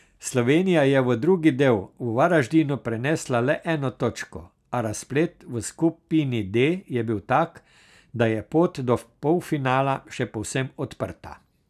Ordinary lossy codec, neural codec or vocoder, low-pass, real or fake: none; none; 14.4 kHz; real